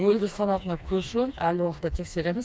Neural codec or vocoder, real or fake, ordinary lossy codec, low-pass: codec, 16 kHz, 2 kbps, FreqCodec, smaller model; fake; none; none